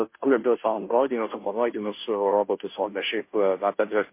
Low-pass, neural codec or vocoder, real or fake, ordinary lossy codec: 3.6 kHz; codec, 16 kHz, 0.5 kbps, FunCodec, trained on Chinese and English, 25 frames a second; fake; MP3, 24 kbps